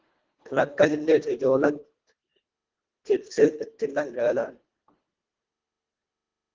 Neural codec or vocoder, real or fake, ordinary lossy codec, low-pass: codec, 24 kHz, 1.5 kbps, HILCodec; fake; Opus, 16 kbps; 7.2 kHz